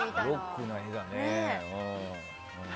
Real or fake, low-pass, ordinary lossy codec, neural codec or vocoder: real; none; none; none